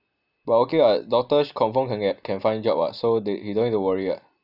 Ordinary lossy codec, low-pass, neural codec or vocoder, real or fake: none; 5.4 kHz; none; real